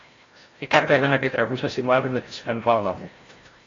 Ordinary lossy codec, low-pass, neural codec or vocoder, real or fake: AAC, 32 kbps; 7.2 kHz; codec, 16 kHz, 0.5 kbps, FreqCodec, larger model; fake